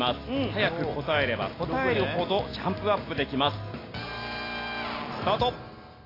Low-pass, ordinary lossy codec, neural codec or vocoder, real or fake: 5.4 kHz; AAC, 24 kbps; none; real